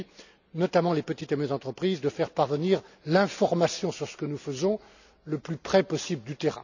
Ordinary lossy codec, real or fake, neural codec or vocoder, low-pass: none; real; none; 7.2 kHz